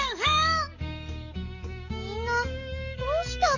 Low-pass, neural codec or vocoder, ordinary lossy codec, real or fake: 7.2 kHz; codec, 44.1 kHz, 7.8 kbps, DAC; none; fake